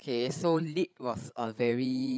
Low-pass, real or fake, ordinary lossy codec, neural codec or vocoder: none; fake; none; codec, 16 kHz, 8 kbps, FreqCodec, larger model